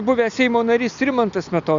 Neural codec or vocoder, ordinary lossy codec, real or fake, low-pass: none; Opus, 64 kbps; real; 7.2 kHz